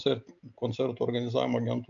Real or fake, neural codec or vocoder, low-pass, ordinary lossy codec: real; none; 7.2 kHz; MP3, 64 kbps